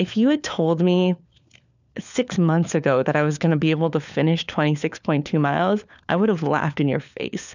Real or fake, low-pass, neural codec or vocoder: fake; 7.2 kHz; codec, 16 kHz, 4 kbps, FunCodec, trained on LibriTTS, 50 frames a second